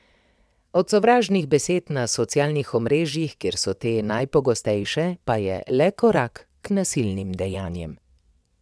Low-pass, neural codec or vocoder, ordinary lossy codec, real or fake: none; vocoder, 22.05 kHz, 80 mel bands, WaveNeXt; none; fake